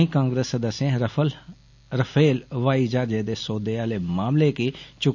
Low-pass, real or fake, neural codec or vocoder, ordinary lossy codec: 7.2 kHz; real; none; none